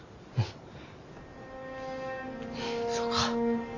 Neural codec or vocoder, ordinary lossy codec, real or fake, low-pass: none; Opus, 64 kbps; real; 7.2 kHz